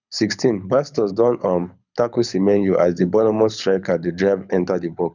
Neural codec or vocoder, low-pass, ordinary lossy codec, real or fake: codec, 24 kHz, 6 kbps, HILCodec; 7.2 kHz; none; fake